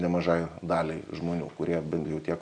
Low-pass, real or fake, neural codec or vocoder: 9.9 kHz; real; none